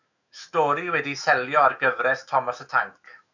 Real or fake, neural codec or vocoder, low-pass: fake; autoencoder, 48 kHz, 128 numbers a frame, DAC-VAE, trained on Japanese speech; 7.2 kHz